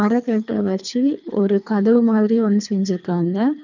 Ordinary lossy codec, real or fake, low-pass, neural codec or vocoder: none; fake; 7.2 kHz; codec, 24 kHz, 3 kbps, HILCodec